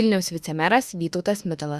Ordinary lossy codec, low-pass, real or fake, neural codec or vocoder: Opus, 64 kbps; 14.4 kHz; fake; autoencoder, 48 kHz, 32 numbers a frame, DAC-VAE, trained on Japanese speech